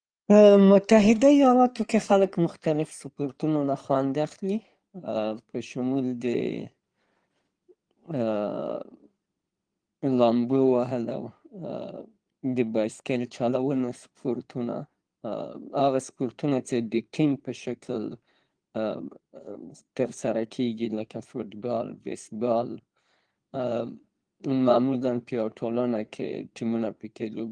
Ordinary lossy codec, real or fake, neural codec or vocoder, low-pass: Opus, 24 kbps; fake; codec, 16 kHz in and 24 kHz out, 2.2 kbps, FireRedTTS-2 codec; 9.9 kHz